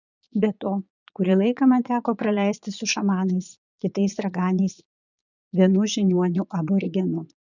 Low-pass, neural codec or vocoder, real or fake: 7.2 kHz; vocoder, 22.05 kHz, 80 mel bands, Vocos; fake